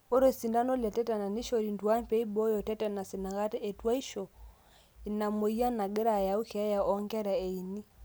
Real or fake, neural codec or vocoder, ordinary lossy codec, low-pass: real; none; none; none